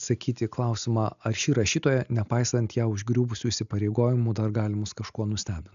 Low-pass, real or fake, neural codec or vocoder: 7.2 kHz; real; none